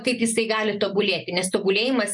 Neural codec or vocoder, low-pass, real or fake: none; 10.8 kHz; real